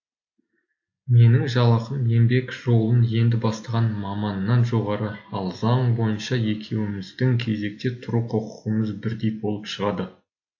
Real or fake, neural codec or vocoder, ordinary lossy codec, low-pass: real; none; AAC, 48 kbps; 7.2 kHz